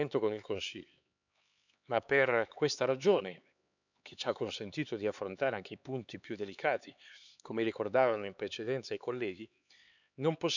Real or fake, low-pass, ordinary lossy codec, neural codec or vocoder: fake; 7.2 kHz; none; codec, 16 kHz, 4 kbps, X-Codec, HuBERT features, trained on LibriSpeech